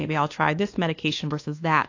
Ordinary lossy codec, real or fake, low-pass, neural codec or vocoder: AAC, 48 kbps; fake; 7.2 kHz; codec, 24 kHz, 1.2 kbps, DualCodec